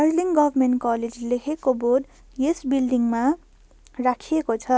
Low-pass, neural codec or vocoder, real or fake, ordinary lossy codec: none; none; real; none